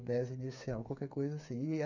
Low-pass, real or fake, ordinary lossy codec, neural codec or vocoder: 7.2 kHz; fake; none; codec, 16 kHz, 8 kbps, FreqCodec, smaller model